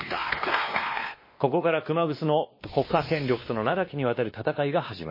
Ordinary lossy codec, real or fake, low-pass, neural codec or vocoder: MP3, 24 kbps; fake; 5.4 kHz; codec, 16 kHz, 2 kbps, X-Codec, WavLM features, trained on Multilingual LibriSpeech